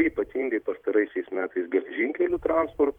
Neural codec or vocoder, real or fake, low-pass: vocoder, 44.1 kHz, 128 mel bands every 512 samples, BigVGAN v2; fake; 19.8 kHz